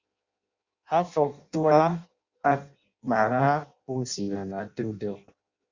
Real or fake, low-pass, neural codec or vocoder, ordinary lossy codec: fake; 7.2 kHz; codec, 16 kHz in and 24 kHz out, 0.6 kbps, FireRedTTS-2 codec; Opus, 64 kbps